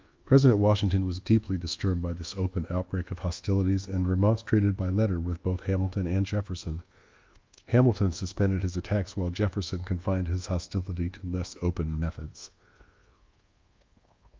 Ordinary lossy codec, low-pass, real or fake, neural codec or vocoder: Opus, 24 kbps; 7.2 kHz; fake; codec, 24 kHz, 1.2 kbps, DualCodec